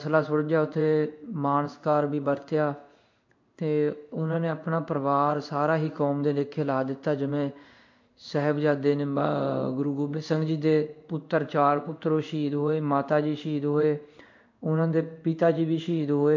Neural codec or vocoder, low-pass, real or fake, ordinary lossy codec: codec, 16 kHz in and 24 kHz out, 1 kbps, XY-Tokenizer; 7.2 kHz; fake; MP3, 48 kbps